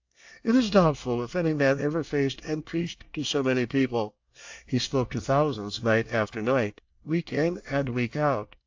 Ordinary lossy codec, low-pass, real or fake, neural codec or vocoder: AAC, 48 kbps; 7.2 kHz; fake; codec, 24 kHz, 1 kbps, SNAC